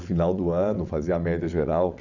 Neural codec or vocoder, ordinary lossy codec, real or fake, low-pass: vocoder, 22.05 kHz, 80 mel bands, WaveNeXt; none; fake; 7.2 kHz